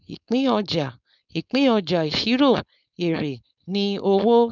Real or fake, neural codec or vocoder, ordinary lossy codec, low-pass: fake; codec, 16 kHz, 4.8 kbps, FACodec; none; 7.2 kHz